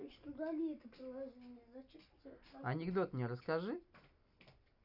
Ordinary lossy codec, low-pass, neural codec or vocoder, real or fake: none; 5.4 kHz; none; real